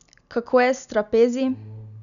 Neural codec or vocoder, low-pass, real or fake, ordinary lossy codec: none; 7.2 kHz; real; none